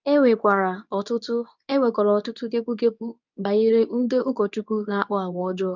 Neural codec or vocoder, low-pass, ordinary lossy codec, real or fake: codec, 24 kHz, 0.9 kbps, WavTokenizer, medium speech release version 2; 7.2 kHz; none; fake